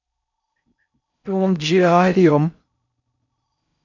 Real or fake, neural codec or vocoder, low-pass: fake; codec, 16 kHz in and 24 kHz out, 0.6 kbps, FocalCodec, streaming, 4096 codes; 7.2 kHz